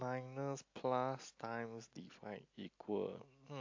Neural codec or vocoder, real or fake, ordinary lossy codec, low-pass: none; real; AAC, 48 kbps; 7.2 kHz